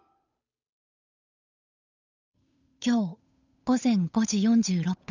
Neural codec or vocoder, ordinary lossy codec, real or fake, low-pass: codec, 16 kHz, 8 kbps, FunCodec, trained on Chinese and English, 25 frames a second; none; fake; 7.2 kHz